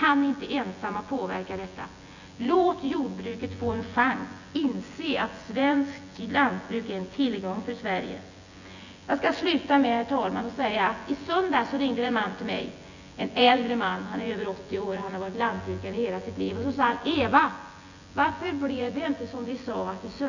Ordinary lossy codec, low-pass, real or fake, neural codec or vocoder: none; 7.2 kHz; fake; vocoder, 24 kHz, 100 mel bands, Vocos